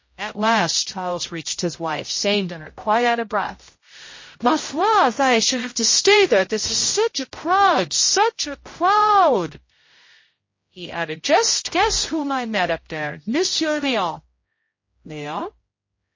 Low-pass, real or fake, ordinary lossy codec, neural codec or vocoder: 7.2 kHz; fake; MP3, 32 kbps; codec, 16 kHz, 0.5 kbps, X-Codec, HuBERT features, trained on general audio